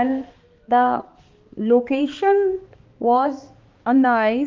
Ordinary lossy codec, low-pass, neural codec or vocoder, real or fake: Opus, 32 kbps; 7.2 kHz; codec, 16 kHz, 2 kbps, X-Codec, HuBERT features, trained on balanced general audio; fake